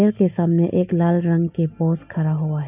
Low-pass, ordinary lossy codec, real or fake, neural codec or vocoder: 3.6 kHz; AAC, 32 kbps; real; none